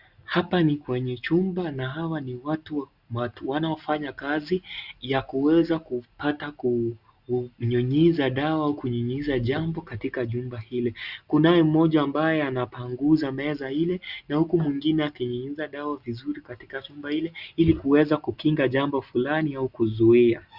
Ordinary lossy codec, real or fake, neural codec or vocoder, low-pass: Opus, 64 kbps; real; none; 5.4 kHz